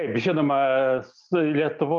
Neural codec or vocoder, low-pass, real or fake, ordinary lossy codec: none; 7.2 kHz; real; Opus, 32 kbps